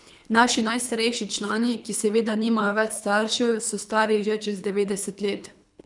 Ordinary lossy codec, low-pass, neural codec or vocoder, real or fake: none; 10.8 kHz; codec, 24 kHz, 3 kbps, HILCodec; fake